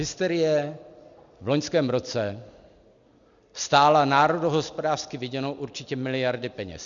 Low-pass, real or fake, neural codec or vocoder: 7.2 kHz; real; none